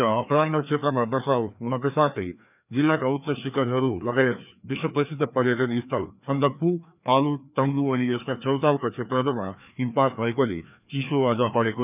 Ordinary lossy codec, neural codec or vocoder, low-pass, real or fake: none; codec, 16 kHz, 2 kbps, FreqCodec, larger model; 3.6 kHz; fake